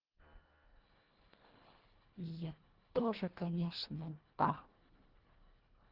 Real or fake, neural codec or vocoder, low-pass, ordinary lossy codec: fake; codec, 24 kHz, 1.5 kbps, HILCodec; 5.4 kHz; Opus, 16 kbps